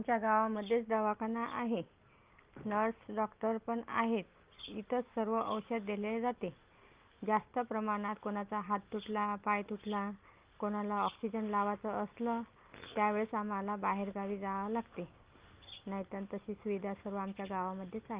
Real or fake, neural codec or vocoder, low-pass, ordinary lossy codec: real; none; 3.6 kHz; Opus, 32 kbps